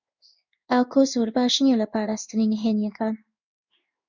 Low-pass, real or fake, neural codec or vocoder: 7.2 kHz; fake; codec, 16 kHz in and 24 kHz out, 1 kbps, XY-Tokenizer